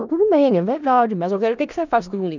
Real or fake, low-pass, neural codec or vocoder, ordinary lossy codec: fake; 7.2 kHz; codec, 16 kHz in and 24 kHz out, 0.4 kbps, LongCat-Audio-Codec, four codebook decoder; none